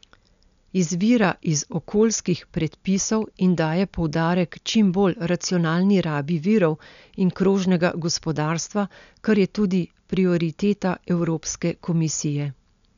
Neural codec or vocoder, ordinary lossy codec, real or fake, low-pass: none; none; real; 7.2 kHz